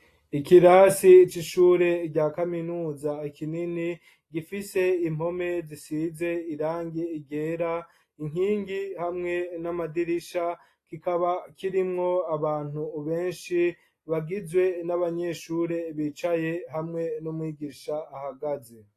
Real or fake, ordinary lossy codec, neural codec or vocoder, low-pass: real; AAC, 48 kbps; none; 14.4 kHz